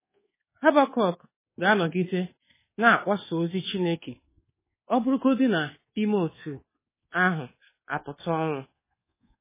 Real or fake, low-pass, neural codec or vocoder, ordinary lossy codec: fake; 3.6 kHz; codec, 24 kHz, 1.2 kbps, DualCodec; MP3, 16 kbps